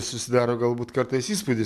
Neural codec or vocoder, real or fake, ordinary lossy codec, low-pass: none; real; MP3, 96 kbps; 14.4 kHz